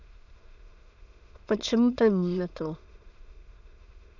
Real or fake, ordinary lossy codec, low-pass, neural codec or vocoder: fake; none; 7.2 kHz; autoencoder, 22.05 kHz, a latent of 192 numbers a frame, VITS, trained on many speakers